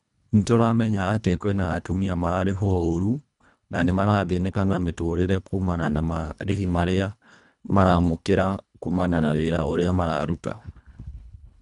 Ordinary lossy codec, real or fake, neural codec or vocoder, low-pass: none; fake; codec, 24 kHz, 1.5 kbps, HILCodec; 10.8 kHz